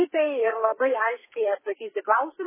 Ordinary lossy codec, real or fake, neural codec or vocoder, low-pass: MP3, 16 kbps; fake; codec, 32 kHz, 1.9 kbps, SNAC; 3.6 kHz